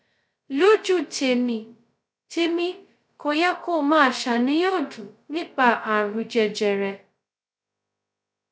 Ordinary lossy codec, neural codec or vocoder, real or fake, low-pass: none; codec, 16 kHz, 0.2 kbps, FocalCodec; fake; none